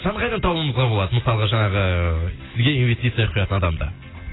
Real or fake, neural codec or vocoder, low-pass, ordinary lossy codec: real; none; 7.2 kHz; AAC, 16 kbps